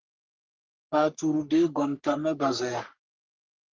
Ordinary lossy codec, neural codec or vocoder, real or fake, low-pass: Opus, 24 kbps; codec, 44.1 kHz, 3.4 kbps, Pupu-Codec; fake; 7.2 kHz